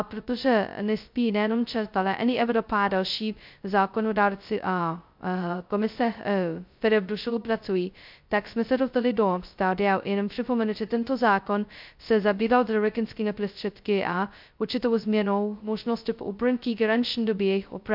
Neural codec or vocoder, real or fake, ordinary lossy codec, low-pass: codec, 16 kHz, 0.2 kbps, FocalCodec; fake; MP3, 48 kbps; 5.4 kHz